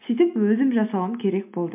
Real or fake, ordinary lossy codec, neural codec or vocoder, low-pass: real; MP3, 32 kbps; none; 3.6 kHz